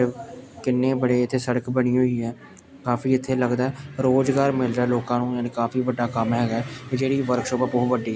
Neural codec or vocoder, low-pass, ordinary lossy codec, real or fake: none; none; none; real